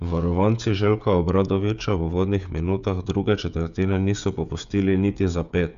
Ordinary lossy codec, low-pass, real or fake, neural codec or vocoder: none; 7.2 kHz; fake; codec, 16 kHz, 16 kbps, FreqCodec, smaller model